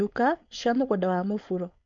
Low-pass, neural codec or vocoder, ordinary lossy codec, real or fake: 7.2 kHz; codec, 16 kHz, 4.8 kbps, FACodec; MP3, 48 kbps; fake